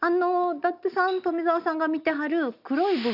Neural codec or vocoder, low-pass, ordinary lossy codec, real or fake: none; 5.4 kHz; none; real